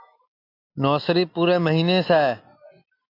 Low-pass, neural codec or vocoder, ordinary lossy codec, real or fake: 5.4 kHz; none; AAC, 32 kbps; real